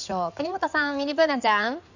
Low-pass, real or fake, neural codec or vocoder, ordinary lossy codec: 7.2 kHz; fake; codec, 16 kHz in and 24 kHz out, 2.2 kbps, FireRedTTS-2 codec; none